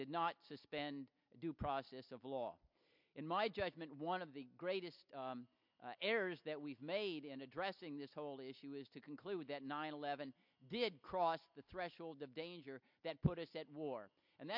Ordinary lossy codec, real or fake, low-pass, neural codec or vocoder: MP3, 48 kbps; real; 5.4 kHz; none